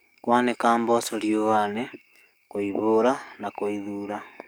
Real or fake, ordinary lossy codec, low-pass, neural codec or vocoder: fake; none; none; codec, 44.1 kHz, 7.8 kbps, DAC